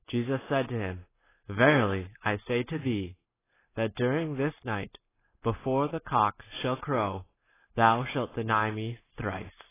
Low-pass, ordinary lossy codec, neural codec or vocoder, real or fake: 3.6 kHz; AAC, 16 kbps; none; real